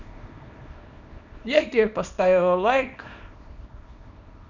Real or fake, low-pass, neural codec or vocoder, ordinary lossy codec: fake; 7.2 kHz; codec, 24 kHz, 0.9 kbps, WavTokenizer, small release; none